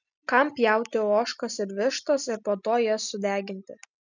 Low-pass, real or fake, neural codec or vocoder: 7.2 kHz; real; none